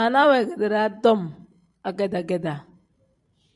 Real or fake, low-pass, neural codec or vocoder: fake; 10.8 kHz; vocoder, 44.1 kHz, 128 mel bands every 512 samples, BigVGAN v2